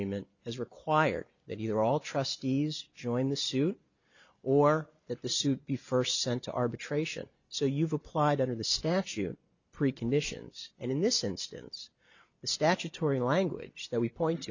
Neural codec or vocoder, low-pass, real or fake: none; 7.2 kHz; real